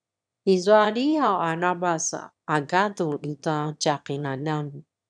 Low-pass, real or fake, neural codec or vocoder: 9.9 kHz; fake; autoencoder, 22.05 kHz, a latent of 192 numbers a frame, VITS, trained on one speaker